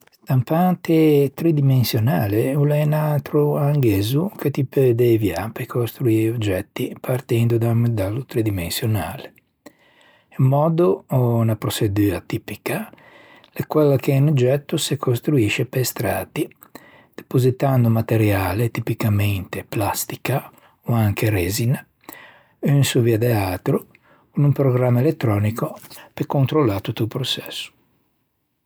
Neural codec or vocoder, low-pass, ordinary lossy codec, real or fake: none; none; none; real